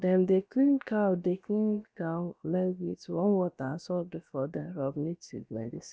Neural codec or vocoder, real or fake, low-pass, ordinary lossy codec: codec, 16 kHz, about 1 kbps, DyCAST, with the encoder's durations; fake; none; none